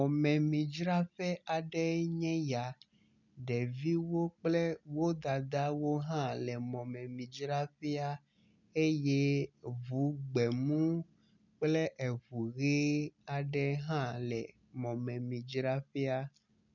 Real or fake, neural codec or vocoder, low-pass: real; none; 7.2 kHz